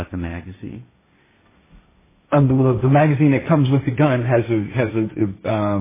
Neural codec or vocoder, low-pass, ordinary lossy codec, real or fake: codec, 16 kHz, 1.1 kbps, Voila-Tokenizer; 3.6 kHz; MP3, 16 kbps; fake